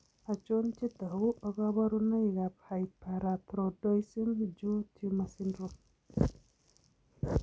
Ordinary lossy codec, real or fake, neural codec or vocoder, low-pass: none; real; none; none